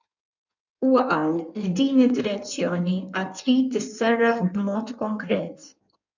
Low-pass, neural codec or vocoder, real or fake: 7.2 kHz; codec, 16 kHz in and 24 kHz out, 1.1 kbps, FireRedTTS-2 codec; fake